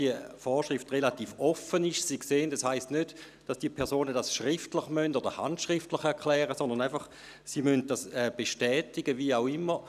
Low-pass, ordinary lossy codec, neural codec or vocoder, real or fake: 14.4 kHz; none; none; real